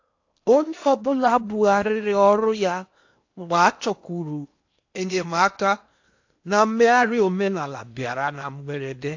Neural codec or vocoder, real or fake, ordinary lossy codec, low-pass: codec, 16 kHz in and 24 kHz out, 0.8 kbps, FocalCodec, streaming, 65536 codes; fake; AAC, 48 kbps; 7.2 kHz